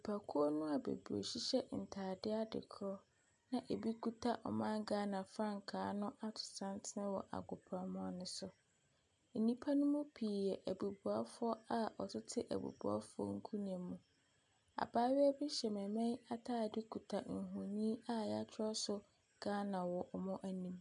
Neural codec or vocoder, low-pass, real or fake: none; 9.9 kHz; real